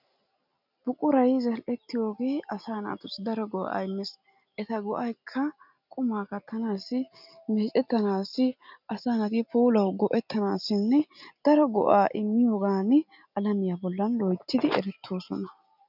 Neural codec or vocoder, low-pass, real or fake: none; 5.4 kHz; real